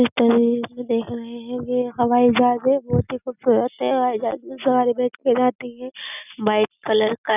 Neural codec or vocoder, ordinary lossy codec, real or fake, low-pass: none; none; real; 3.6 kHz